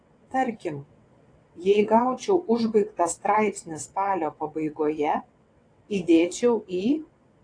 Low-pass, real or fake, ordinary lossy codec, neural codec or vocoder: 9.9 kHz; fake; AAC, 48 kbps; vocoder, 22.05 kHz, 80 mel bands, WaveNeXt